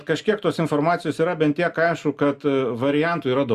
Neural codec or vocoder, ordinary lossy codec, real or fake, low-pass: none; Opus, 64 kbps; real; 14.4 kHz